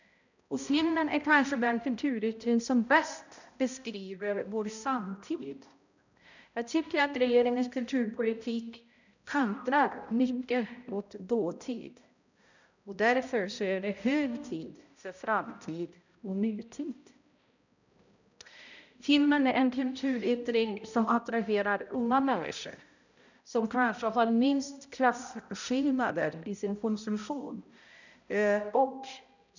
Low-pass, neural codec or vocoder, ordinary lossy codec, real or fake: 7.2 kHz; codec, 16 kHz, 0.5 kbps, X-Codec, HuBERT features, trained on balanced general audio; none; fake